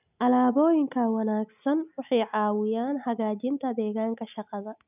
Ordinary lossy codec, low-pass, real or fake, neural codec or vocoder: none; 3.6 kHz; real; none